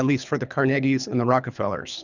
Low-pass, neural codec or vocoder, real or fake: 7.2 kHz; codec, 24 kHz, 3 kbps, HILCodec; fake